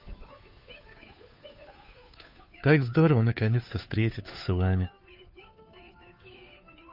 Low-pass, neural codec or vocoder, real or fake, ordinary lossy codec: 5.4 kHz; codec, 16 kHz, 2 kbps, FunCodec, trained on Chinese and English, 25 frames a second; fake; none